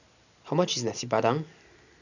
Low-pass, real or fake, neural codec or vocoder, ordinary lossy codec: 7.2 kHz; real; none; none